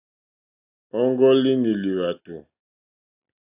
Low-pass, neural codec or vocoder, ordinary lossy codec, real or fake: 3.6 kHz; none; AAC, 32 kbps; real